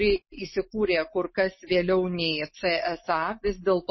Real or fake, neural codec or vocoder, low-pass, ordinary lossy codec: real; none; 7.2 kHz; MP3, 24 kbps